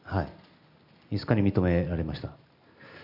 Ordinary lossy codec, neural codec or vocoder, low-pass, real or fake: none; none; 5.4 kHz; real